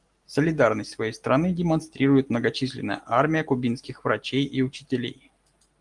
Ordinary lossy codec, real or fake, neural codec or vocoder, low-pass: Opus, 24 kbps; real; none; 10.8 kHz